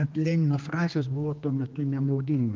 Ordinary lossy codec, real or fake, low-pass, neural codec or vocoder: Opus, 24 kbps; fake; 7.2 kHz; codec, 16 kHz, 2 kbps, X-Codec, HuBERT features, trained on general audio